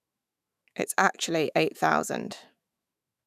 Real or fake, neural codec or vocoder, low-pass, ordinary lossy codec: fake; autoencoder, 48 kHz, 128 numbers a frame, DAC-VAE, trained on Japanese speech; 14.4 kHz; none